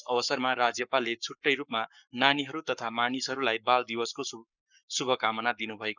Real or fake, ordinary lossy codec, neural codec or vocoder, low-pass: fake; none; codec, 44.1 kHz, 7.8 kbps, Pupu-Codec; 7.2 kHz